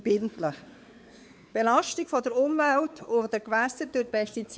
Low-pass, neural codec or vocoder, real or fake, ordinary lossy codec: none; codec, 16 kHz, 4 kbps, X-Codec, WavLM features, trained on Multilingual LibriSpeech; fake; none